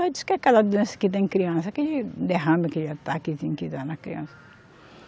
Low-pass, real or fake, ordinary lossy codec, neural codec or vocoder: none; real; none; none